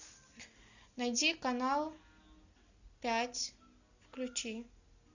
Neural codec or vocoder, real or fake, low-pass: none; real; 7.2 kHz